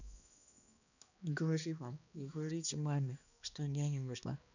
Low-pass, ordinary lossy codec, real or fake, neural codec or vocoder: 7.2 kHz; none; fake; codec, 16 kHz, 2 kbps, X-Codec, HuBERT features, trained on balanced general audio